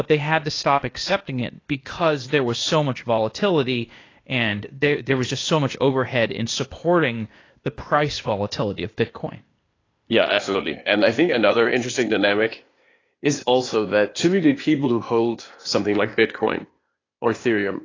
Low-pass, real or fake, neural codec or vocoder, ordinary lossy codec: 7.2 kHz; fake; codec, 16 kHz, 0.8 kbps, ZipCodec; AAC, 32 kbps